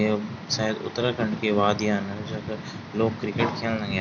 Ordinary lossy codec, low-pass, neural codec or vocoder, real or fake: none; 7.2 kHz; none; real